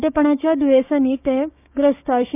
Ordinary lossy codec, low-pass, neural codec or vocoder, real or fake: none; 3.6 kHz; codec, 16 kHz, 6 kbps, DAC; fake